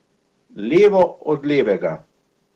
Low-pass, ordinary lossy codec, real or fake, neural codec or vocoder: 14.4 kHz; Opus, 16 kbps; real; none